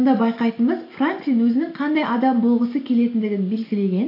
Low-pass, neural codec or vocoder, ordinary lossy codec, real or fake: 5.4 kHz; none; AAC, 32 kbps; real